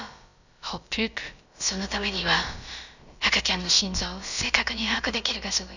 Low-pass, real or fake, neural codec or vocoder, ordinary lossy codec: 7.2 kHz; fake; codec, 16 kHz, about 1 kbps, DyCAST, with the encoder's durations; none